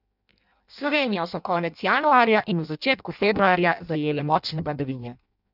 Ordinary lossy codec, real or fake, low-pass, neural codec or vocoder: none; fake; 5.4 kHz; codec, 16 kHz in and 24 kHz out, 0.6 kbps, FireRedTTS-2 codec